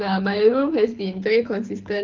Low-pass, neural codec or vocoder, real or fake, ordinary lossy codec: 7.2 kHz; codec, 24 kHz, 6 kbps, HILCodec; fake; Opus, 32 kbps